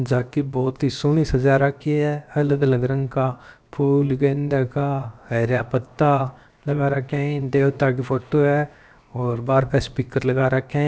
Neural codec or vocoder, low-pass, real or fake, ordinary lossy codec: codec, 16 kHz, 0.7 kbps, FocalCodec; none; fake; none